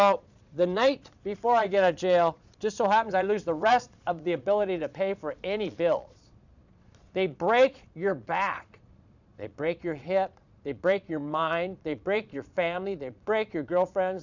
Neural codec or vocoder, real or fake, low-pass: vocoder, 22.05 kHz, 80 mel bands, Vocos; fake; 7.2 kHz